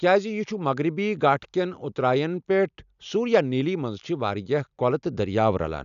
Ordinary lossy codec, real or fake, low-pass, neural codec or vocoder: none; real; 7.2 kHz; none